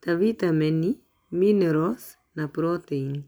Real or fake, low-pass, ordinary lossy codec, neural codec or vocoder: real; none; none; none